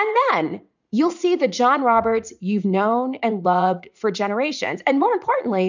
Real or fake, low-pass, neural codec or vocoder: fake; 7.2 kHz; vocoder, 44.1 kHz, 80 mel bands, Vocos